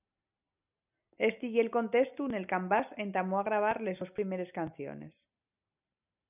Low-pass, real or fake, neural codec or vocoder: 3.6 kHz; real; none